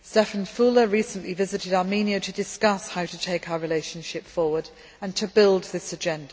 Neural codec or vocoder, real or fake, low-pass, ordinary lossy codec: none; real; none; none